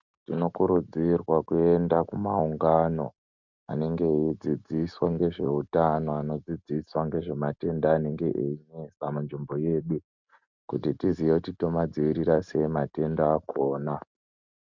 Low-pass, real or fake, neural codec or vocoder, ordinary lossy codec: 7.2 kHz; real; none; MP3, 64 kbps